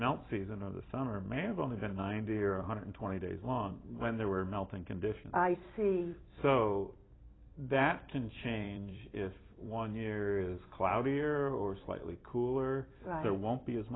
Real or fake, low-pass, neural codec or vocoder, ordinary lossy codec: fake; 7.2 kHz; vocoder, 44.1 kHz, 128 mel bands every 256 samples, BigVGAN v2; AAC, 16 kbps